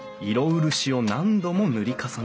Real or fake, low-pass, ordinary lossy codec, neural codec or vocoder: real; none; none; none